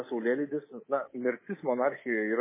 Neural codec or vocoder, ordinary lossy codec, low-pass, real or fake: codec, 24 kHz, 3.1 kbps, DualCodec; MP3, 16 kbps; 3.6 kHz; fake